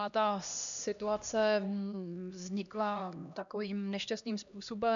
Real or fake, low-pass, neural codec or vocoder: fake; 7.2 kHz; codec, 16 kHz, 1 kbps, X-Codec, HuBERT features, trained on LibriSpeech